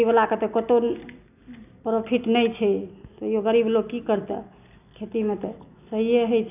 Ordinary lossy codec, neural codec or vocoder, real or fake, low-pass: none; none; real; 3.6 kHz